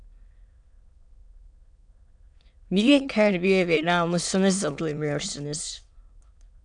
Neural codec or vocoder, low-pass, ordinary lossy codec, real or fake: autoencoder, 22.05 kHz, a latent of 192 numbers a frame, VITS, trained on many speakers; 9.9 kHz; MP3, 96 kbps; fake